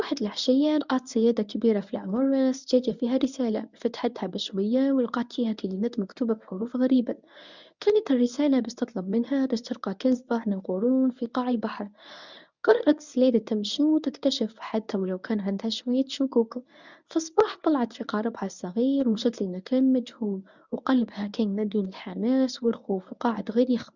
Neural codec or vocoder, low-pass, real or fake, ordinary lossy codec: codec, 24 kHz, 0.9 kbps, WavTokenizer, medium speech release version 1; 7.2 kHz; fake; none